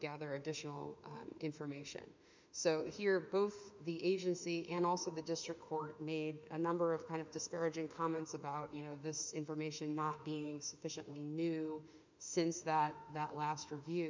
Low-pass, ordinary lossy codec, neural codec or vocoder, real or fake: 7.2 kHz; MP3, 64 kbps; autoencoder, 48 kHz, 32 numbers a frame, DAC-VAE, trained on Japanese speech; fake